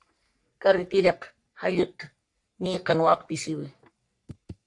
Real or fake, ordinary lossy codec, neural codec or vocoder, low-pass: fake; AAC, 64 kbps; codec, 44.1 kHz, 3.4 kbps, Pupu-Codec; 10.8 kHz